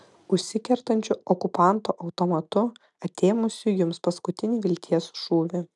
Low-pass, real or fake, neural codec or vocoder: 10.8 kHz; real; none